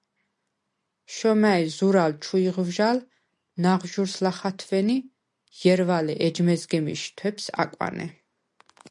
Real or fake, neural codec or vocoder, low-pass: real; none; 10.8 kHz